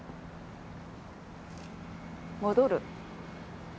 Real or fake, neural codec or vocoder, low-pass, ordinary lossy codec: real; none; none; none